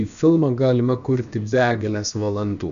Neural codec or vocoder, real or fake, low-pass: codec, 16 kHz, about 1 kbps, DyCAST, with the encoder's durations; fake; 7.2 kHz